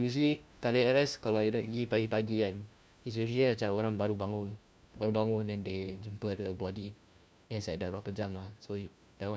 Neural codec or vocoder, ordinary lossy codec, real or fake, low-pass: codec, 16 kHz, 1 kbps, FunCodec, trained on LibriTTS, 50 frames a second; none; fake; none